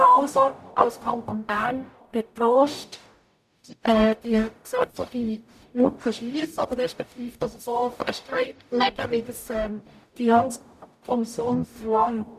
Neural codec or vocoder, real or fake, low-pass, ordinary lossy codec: codec, 44.1 kHz, 0.9 kbps, DAC; fake; 14.4 kHz; none